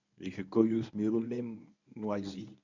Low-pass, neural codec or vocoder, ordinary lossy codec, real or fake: 7.2 kHz; codec, 24 kHz, 0.9 kbps, WavTokenizer, medium speech release version 1; none; fake